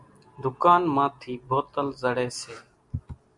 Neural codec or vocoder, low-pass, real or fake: none; 10.8 kHz; real